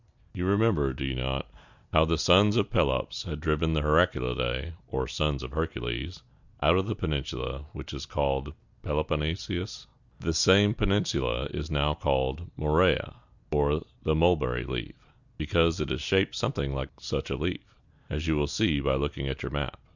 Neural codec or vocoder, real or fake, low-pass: none; real; 7.2 kHz